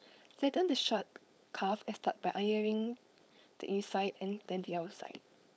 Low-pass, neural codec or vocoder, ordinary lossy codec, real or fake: none; codec, 16 kHz, 4.8 kbps, FACodec; none; fake